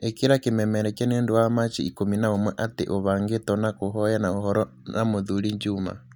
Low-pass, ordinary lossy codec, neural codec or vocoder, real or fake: 19.8 kHz; none; none; real